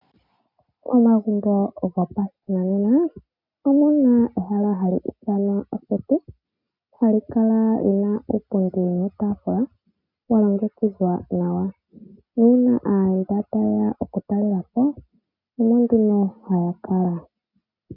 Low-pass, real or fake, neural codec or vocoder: 5.4 kHz; real; none